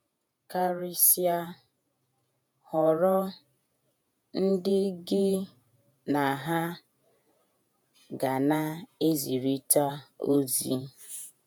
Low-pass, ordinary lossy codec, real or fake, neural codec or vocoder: none; none; fake; vocoder, 48 kHz, 128 mel bands, Vocos